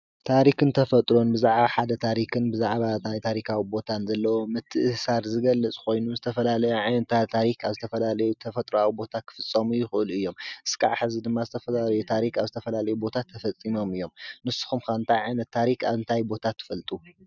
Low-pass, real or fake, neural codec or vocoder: 7.2 kHz; real; none